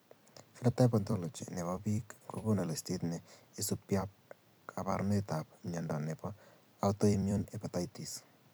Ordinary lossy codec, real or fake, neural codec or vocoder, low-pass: none; fake; vocoder, 44.1 kHz, 128 mel bands every 256 samples, BigVGAN v2; none